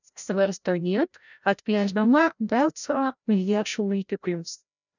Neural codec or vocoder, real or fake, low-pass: codec, 16 kHz, 0.5 kbps, FreqCodec, larger model; fake; 7.2 kHz